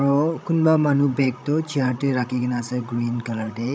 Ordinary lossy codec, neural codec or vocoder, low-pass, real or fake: none; codec, 16 kHz, 16 kbps, FreqCodec, larger model; none; fake